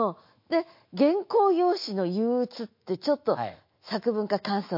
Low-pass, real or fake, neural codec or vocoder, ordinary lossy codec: 5.4 kHz; fake; autoencoder, 48 kHz, 128 numbers a frame, DAC-VAE, trained on Japanese speech; MP3, 32 kbps